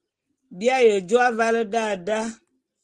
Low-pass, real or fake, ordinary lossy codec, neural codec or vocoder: 10.8 kHz; real; Opus, 16 kbps; none